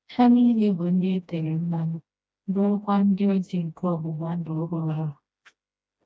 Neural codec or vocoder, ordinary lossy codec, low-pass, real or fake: codec, 16 kHz, 1 kbps, FreqCodec, smaller model; none; none; fake